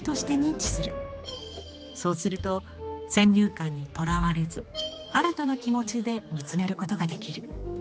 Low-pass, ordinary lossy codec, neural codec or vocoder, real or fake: none; none; codec, 16 kHz, 2 kbps, X-Codec, HuBERT features, trained on general audio; fake